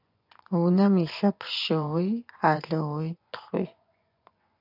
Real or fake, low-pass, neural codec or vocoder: fake; 5.4 kHz; vocoder, 24 kHz, 100 mel bands, Vocos